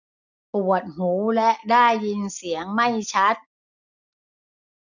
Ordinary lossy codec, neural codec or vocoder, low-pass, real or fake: none; none; 7.2 kHz; real